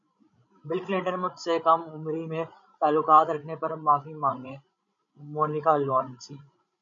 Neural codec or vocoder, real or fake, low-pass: codec, 16 kHz, 16 kbps, FreqCodec, larger model; fake; 7.2 kHz